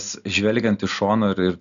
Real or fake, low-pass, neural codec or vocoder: real; 7.2 kHz; none